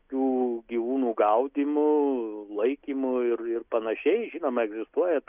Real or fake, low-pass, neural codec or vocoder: real; 3.6 kHz; none